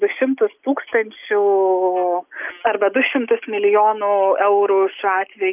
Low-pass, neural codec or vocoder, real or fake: 3.6 kHz; none; real